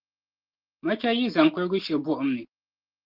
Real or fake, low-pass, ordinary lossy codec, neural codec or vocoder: real; 5.4 kHz; Opus, 16 kbps; none